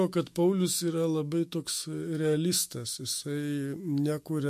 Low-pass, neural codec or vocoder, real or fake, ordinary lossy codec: 14.4 kHz; autoencoder, 48 kHz, 128 numbers a frame, DAC-VAE, trained on Japanese speech; fake; MP3, 64 kbps